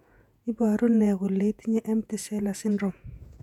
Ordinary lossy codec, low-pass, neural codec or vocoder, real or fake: none; 19.8 kHz; vocoder, 48 kHz, 128 mel bands, Vocos; fake